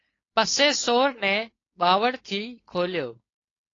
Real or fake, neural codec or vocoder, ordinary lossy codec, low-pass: fake; codec, 16 kHz, 4.8 kbps, FACodec; AAC, 32 kbps; 7.2 kHz